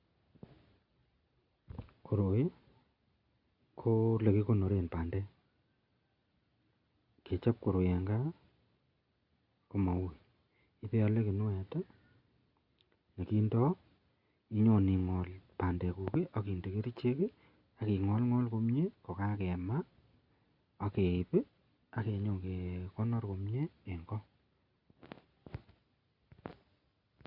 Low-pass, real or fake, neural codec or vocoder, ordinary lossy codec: 5.4 kHz; real; none; Opus, 64 kbps